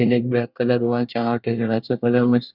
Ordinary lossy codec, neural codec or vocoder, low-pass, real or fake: none; codec, 24 kHz, 1 kbps, SNAC; 5.4 kHz; fake